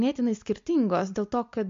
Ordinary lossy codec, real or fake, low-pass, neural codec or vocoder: MP3, 48 kbps; real; 7.2 kHz; none